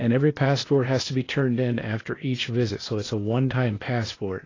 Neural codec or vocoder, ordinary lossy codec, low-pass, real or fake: codec, 16 kHz, 0.8 kbps, ZipCodec; AAC, 32 kbps; 7.2 kHz; fake